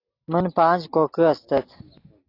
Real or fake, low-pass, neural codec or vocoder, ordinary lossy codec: real; 5.4 kHz; none; AAC, 32 kbps